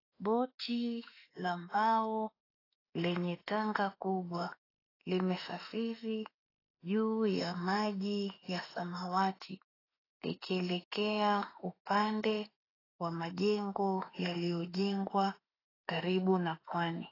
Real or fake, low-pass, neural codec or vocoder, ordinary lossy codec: fake; 5.4 kHz; autoencoder, 48 kHz, 32 numbers a frame, DAC-VAE, trained on Japanese speech; AAC, 24 kbps